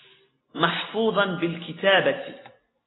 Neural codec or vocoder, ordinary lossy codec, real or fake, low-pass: none; AAC, 16 kbps; real; 7.2 kHz